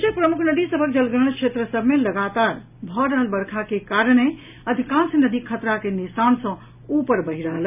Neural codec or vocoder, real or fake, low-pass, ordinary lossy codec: none; real; 3.6 kHz; none